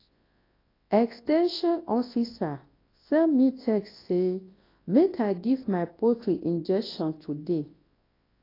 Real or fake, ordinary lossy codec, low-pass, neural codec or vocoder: fake; AAC, 24 kbps; 5.4 kHz; codec, 24 kHz, 0.9 kbps, WavTokenizer, large speech release